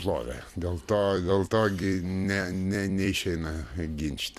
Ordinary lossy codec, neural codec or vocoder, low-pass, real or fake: Opus, 64 kbps; vocoder, 44.1 kHz, 128 mel bands every 256 samples, BigVGAN v2; 14.4 kHz; fake